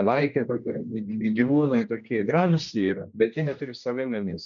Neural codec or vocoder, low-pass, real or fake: codec, 16 kHz, 1 kbps, X-Codec, HuBERT features, trained on general audio; 7.2 kHz; fake